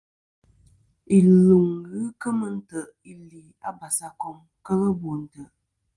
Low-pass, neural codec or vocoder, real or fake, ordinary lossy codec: 10.8 kHz; none; real; Opus, 24 kbps